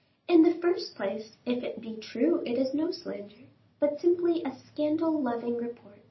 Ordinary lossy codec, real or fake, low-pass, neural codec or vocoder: MP3, 24 kbps; real; 7.2 kHz; none